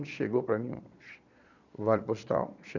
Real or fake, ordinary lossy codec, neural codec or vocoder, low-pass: fake; none; vocoder, 22.05 kHz, 80 mel bands, Vocos; 7.2 kHz